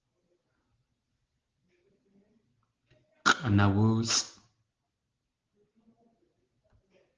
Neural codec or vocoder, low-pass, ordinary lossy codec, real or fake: none; 7.2 kHz; Opus, 16 kbps; real